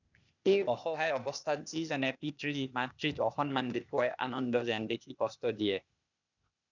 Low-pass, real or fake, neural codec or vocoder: 7.2 kHz; fake; codec, 16 kHz, 0.8 kbps, ZipCodec